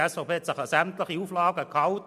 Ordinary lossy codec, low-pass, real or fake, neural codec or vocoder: MP3, 96 kbps; 14.4 kHz; real; none